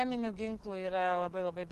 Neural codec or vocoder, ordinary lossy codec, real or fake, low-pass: codec, 44.1 kHz, 2.6 kbps, SNAC; Opus, 16 kbps; fake; 14.4 kHz